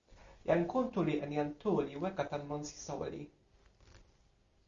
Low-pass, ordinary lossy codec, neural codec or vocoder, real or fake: 7.2 kHz; Opus, 64 kbps; none; real